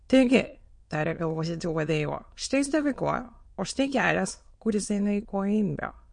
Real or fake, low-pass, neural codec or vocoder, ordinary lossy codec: fake; 9.9 kHz; autoencoder, 22.05 kHz, a latent of 192 numbers a frame, VITS, trained on many speakers; MP3, 48 kbps